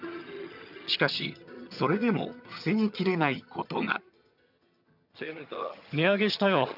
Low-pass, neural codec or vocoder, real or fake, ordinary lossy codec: 5.4 kHz; vocoder, 22.05 kHz, 80 mel bands, HiFi-GAN; fake; none